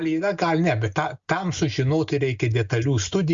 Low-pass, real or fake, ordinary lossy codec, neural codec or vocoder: 7.2 kHz; real; Opus, 64 kbps; none